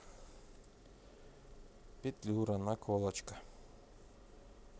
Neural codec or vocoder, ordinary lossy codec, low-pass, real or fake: none; none; none; real